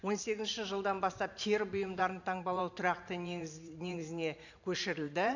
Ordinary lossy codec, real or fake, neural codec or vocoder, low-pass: none; fake; vocoder, 44.1 kHz, 128 mel bands every 512 samples, BigVGAN v2; 7.2 kHz